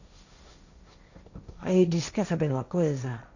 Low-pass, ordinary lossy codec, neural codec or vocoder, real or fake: 7.2 kHz; none; codec, 16 kHz, 1.1 kbps, Voila-Tokenizer; fake